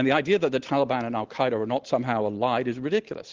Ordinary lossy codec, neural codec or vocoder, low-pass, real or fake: Opus, 16 kbps; none; 7.2 kHz; real